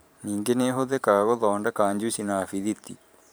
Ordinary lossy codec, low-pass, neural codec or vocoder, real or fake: none; none; vocoder, 44.1 kHz, 128 mel bands every 512 samples, BigVGAN v2; fake